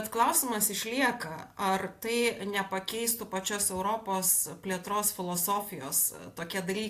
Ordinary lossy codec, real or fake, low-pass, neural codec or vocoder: AAC, 64 kbps; real; 14.4 kHz; none